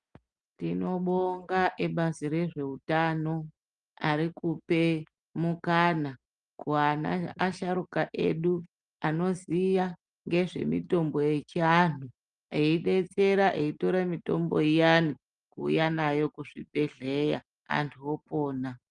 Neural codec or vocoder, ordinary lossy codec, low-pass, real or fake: none; Opus, 32 kbps; 9.9 kHz; real